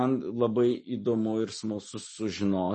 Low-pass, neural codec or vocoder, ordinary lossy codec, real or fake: 10.8 kHz; none; MP3, 32 kbps; real